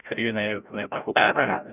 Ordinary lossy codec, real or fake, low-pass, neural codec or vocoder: none; fake; 3.6 kHz; codec, 16 kHz, 0.5 kbps, FreqCodec, larger model